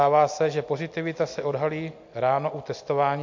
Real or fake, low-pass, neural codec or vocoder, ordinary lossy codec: real; 7.2 kHz; none; MP3, 48 kbps